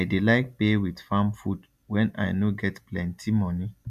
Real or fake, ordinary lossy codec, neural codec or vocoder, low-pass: fake; MP3, 96 kbps; vocoder, 44.1 kHz, 128 mel bands every 256 samples, BigVGAN v2; 14.4 kHz